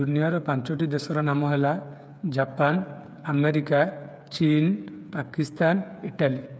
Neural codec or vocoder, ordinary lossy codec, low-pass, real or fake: codec, 16 kHz, 8 kbps, FreqCodec, smaller model; none; none; fake